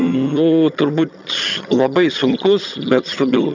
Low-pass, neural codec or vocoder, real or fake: 7.2 kHz; vocoder, 22.05 kHz, 80 mel bands, HiFi-GAN; fake